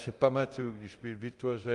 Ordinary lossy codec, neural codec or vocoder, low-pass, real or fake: Opus, 32 kbps; codec, 24 kHz, 0.9 kbps, DualCodec; 10.8 kHz; fake